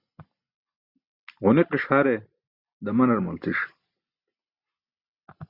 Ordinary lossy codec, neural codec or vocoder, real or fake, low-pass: Opus, 64 kbps; none; real; 5.4 kHz